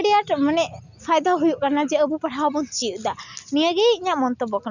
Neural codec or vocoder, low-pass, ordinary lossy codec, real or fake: none; 7.2 kHz; none; real